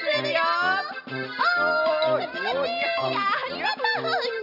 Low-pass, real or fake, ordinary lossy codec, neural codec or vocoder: 5.4 kHz; real; none; none